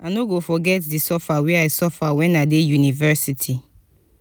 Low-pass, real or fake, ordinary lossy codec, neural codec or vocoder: none; real; none; none